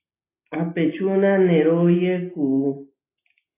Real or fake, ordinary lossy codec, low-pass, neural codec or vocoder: real; AAC, 16 kbps; 3.6 kHz; none